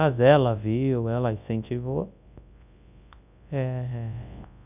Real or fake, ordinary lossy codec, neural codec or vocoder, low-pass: fake; none; codec, 24 kHz, 0.9 kbps, WavTokenizer, large speech release; 3.6 kHz